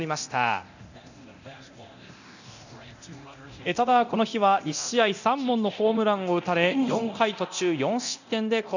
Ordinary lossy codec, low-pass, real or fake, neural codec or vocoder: none; 7.2 kHz; fake; codec, 24 kHz, 0.9 kbps, DualCodec